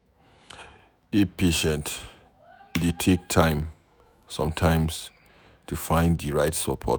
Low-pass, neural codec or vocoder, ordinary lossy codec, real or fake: none; autoencoder, 48 kHz, 128 numbers a frame, DAC-VAE, trained on Japanese speech; none; fake